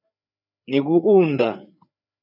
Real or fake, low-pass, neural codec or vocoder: fake; 5.4 kHz; codec, 16 kHz, 4 kbps, FreqCodec, larger model